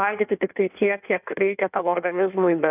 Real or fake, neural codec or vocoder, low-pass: fake; codec, 16 kHz in and 24 kHz out, 1.1 kbps, FireRedTTS-2 codec; 3.6 kHz